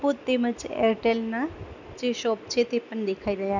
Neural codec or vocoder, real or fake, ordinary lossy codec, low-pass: none; real; none; 7.2 kHz